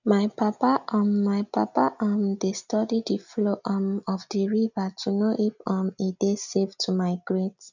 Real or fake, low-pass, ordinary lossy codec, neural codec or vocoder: real; 7.2 kHz; none; none